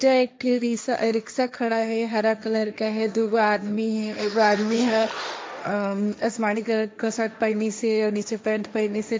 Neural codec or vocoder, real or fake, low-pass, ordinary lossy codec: codec, 16 kHz, 1.1 kbps, Voila-Tokenizer; fake; none; none